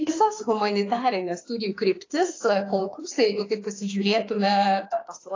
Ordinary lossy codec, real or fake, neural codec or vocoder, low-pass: AAC, 32 kbps; fake; autoencoder, 48 kHz, 32 numbers a frame, DAC-VAE, trained on Japanese speech; 7.2 kHz